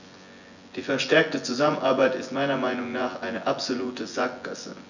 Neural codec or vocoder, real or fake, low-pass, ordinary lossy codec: vocoder, 24 kHz, 100 mel bands, Vocos; fake; 7.2 kHz; none